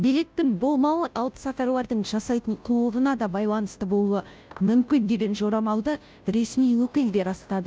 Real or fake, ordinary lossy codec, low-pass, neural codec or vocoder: fake; none; none; codec, 16 kHz, 0.5 kbps, FunCodec, trained on Chinese and English, 25 frames a second